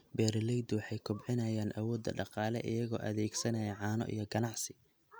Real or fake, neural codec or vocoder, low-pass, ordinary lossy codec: real; none; none; none